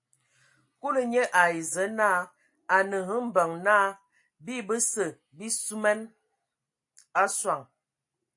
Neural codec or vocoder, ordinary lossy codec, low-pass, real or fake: none; AAC, 64 kbps; 10.8 kHz; real